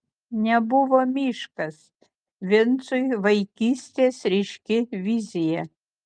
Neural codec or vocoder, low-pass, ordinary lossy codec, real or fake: none; 9.9 kHz; Opus, 32 kbps; real